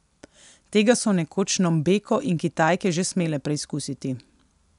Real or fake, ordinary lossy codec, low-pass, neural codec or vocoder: real; none; 10.8 kHz; none